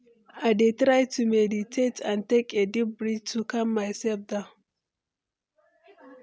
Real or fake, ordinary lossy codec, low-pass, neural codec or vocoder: real; none; none; none